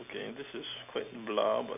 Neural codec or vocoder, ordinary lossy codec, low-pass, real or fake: none; none; 3.6 kHz; real